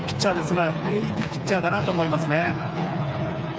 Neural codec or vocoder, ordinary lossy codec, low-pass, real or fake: codec, 16 kHz, 4 kbps, FreqCodec, smaller model; none; none; fake